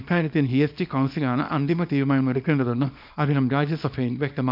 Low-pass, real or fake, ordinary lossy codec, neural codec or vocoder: 5.4 kHz; fake; none; codec, 24 kHz, 0.9 kbps, WavTokenizer, small release